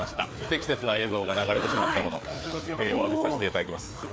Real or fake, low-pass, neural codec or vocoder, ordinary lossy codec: fake; none; codec, 16 kHz, 4 kbps, FreqCodec, larger model; none